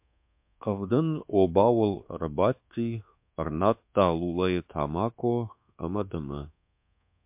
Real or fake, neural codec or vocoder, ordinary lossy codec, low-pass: fake; codec, 24 kHz, 1.2 kbps, DualCodec; MP3, 32 kbps; 3.6 kHz